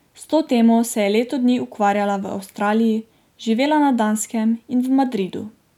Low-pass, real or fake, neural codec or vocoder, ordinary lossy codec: 19.8 kHz; real; none; none